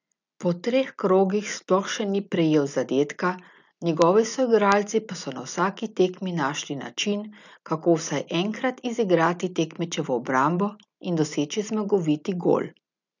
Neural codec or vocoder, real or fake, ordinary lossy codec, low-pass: none; real; none; 7.2 kHz